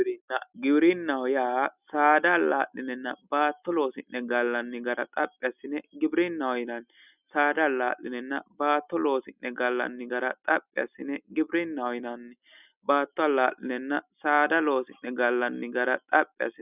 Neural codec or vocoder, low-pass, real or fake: none; 3.6 kHz; real